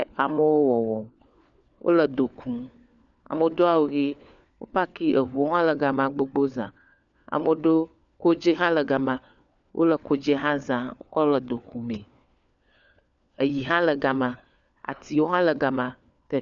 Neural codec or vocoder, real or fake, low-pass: codec, 16 kHz, 4 kbps, FunCodec, trained on LibriTTS, 50 frames a second; fake; 7.2 kHz